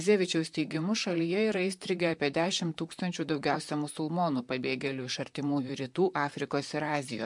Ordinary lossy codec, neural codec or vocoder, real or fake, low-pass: MP3, 64 kbps; vocoder, 44.1 kHz, 128 mel bands, Pupu-Vocoder; fake; 10.8 kHz